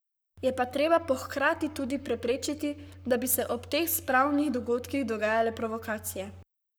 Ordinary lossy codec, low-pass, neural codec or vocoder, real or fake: none; none; codec, 44.1 kHz, 7.8 kbps, Pupu-Codec; fake